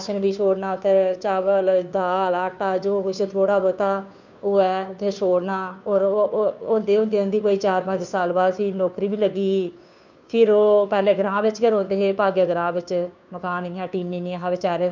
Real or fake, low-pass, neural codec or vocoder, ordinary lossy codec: fake; 7.2 kHz; codec, 16 kHz, 2 kbps, FunCodec, trained on Chinese and English, 25 frames a second; none